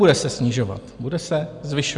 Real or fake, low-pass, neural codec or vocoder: real; 10.8 kHz; none